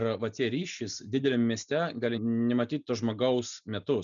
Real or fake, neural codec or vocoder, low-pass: real; none; 7.2 kHz